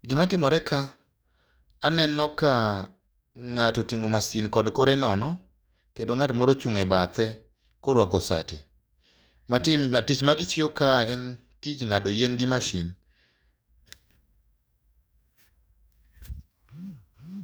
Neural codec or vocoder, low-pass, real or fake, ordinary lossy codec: codec, 44.1 kHz, 2.6 kbps, DAC; none; fake; none